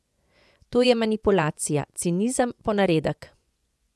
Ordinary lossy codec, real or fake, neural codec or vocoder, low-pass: none; fake; vocoder, 24 kHz, 100 mel bands, Vocos; none